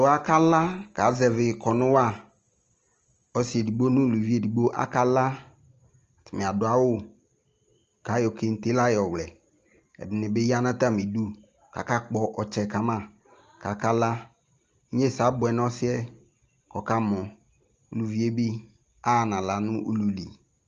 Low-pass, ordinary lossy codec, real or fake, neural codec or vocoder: 7.2 kHz; Opus, 24 kbps; real; none